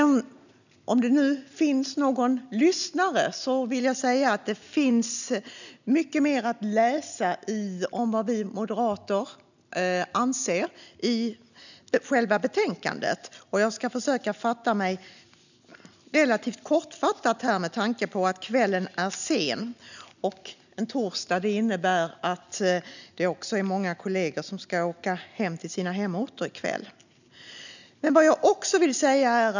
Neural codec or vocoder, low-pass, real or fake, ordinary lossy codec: none; 7.2 kHz; real; none